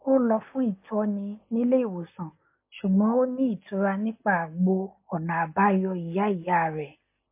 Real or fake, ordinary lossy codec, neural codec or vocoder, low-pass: real; MP3, 24 kbps; none; 3.6 kHz